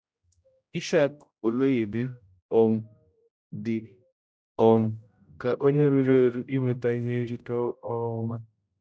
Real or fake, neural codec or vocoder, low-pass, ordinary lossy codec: fake; codec, 16 kHz, 0.5 kbps, X-Codec, HuBERT features, trained on general audio; none; none